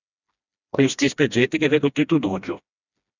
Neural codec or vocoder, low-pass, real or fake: codec, 16 kHz, 1 kbps, FreqCodec, smaller model; 7.2 kHz; fake